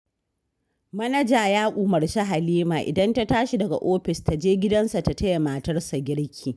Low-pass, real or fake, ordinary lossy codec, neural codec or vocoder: none; real; none; none